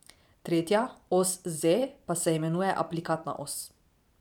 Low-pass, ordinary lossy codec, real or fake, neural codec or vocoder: 19.8 kHz; none; fake; vocoder, 44.1 kHz, 128 mel bands every 512 samples, BigVGAN v2